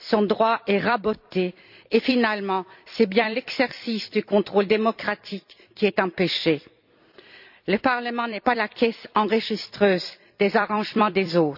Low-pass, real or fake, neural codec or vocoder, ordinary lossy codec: 5.4 kHz; fake; vocoder, 44.1 kHz, 128 mel bands every 256 samples, BigVGAN v2; none